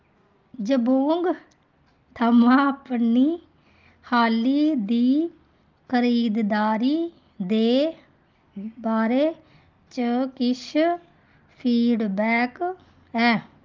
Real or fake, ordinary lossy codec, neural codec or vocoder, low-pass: real; Opus, 24 kbps; none; 7.2 kHz